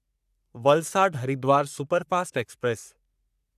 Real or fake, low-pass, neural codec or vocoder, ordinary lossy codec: fake; 14.4 kHz; codec, 44.1 kHz, 3.4 kbps, Pupu-Codec; none